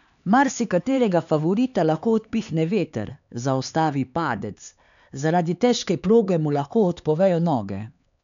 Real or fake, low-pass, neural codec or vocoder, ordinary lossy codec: fake; 7.2 kHz; codec, 16 kHz, 4 kbps, X-Codec, HuBERT features, trained on LibriSpeech; none